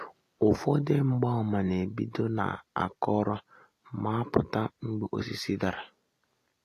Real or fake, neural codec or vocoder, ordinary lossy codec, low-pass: real; none; AAC, 48 kbps; 14.4 kHz